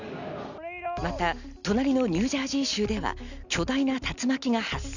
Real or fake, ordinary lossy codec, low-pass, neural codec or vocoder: real; none; 7.2 kHz; none